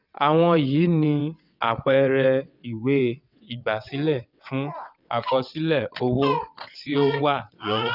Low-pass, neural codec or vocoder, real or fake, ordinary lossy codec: 5.4 kHz; vocoder, 22.05 kHz, 80 mel bands, WaveNeXt; fake; none